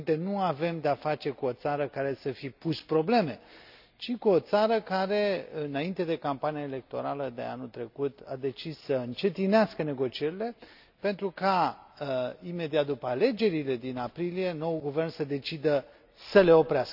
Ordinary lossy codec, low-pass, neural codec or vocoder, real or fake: none; 5.4 kHz; none; real